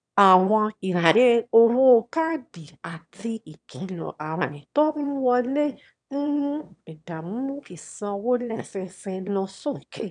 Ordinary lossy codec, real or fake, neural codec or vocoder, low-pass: none; fake; autoencoder, 22.05 kHz, a latent of 192 numbers a frame, VITS, trained on one speaker; 9.9 kHz